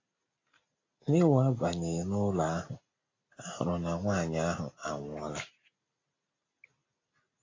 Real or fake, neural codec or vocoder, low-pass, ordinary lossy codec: real; none; 7.2 kHz; AAC, 32 kbps